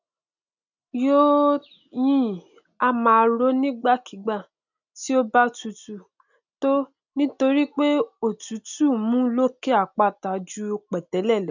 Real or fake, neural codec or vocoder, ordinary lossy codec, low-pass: real; none; none; 7.2 kHz